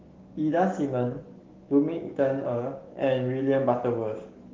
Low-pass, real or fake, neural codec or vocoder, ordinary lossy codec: 7.2 kHz; fake; codec, 16 kHz, 6 kbps, DAC; Opus, 16 kbps